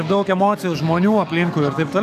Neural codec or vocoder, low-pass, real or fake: codec, 44.1 kHz, 7.8 kbps, DAC; 14.4 kHz; fake